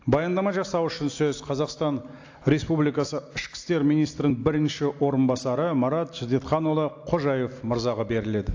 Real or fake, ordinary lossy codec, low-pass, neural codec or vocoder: real; AAC, 48 kbps; 7.2 kHz; none